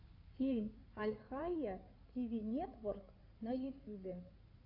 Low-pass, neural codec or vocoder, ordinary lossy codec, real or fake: 5.4 kHz; codec, 16 kHz in and 24 kHz out, 2.2 kbps, FireRedTTS-2 codec; AAC, 32 kbps; fake